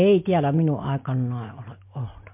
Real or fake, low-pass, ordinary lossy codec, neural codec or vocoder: real; 3.6 kHz; MP3, 32 kbps; none